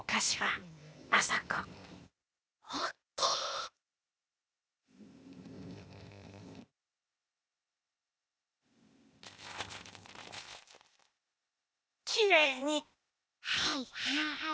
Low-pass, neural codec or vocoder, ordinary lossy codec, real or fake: none; codec, 16 kHz, 0.8 kbps, ZipCodec; none; fake